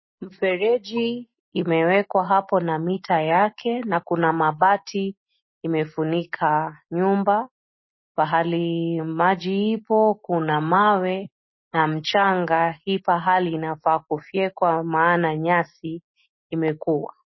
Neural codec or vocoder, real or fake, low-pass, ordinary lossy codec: none; real; 7.2 kHz; MP3, 24 kbps